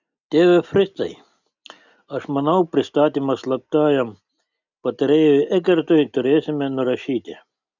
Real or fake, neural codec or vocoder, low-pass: real; none; 7.2 kHz